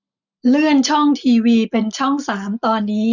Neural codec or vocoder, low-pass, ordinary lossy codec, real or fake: none; 7.2 kHz; none; real